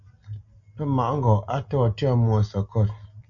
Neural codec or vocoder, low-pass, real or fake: none; 7.2 kHz; real